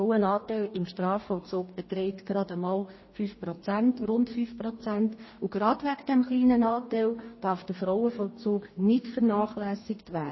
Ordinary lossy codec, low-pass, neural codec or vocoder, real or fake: MP3, 24 kbps; 7.2 kHz; codec, 44.1 kHz, 2.6 kbps, DAC; fake